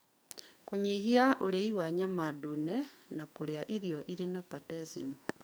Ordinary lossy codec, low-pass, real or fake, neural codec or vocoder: none; none; fake; codec, 44.1 kHz, 2.6 kbps, SNAC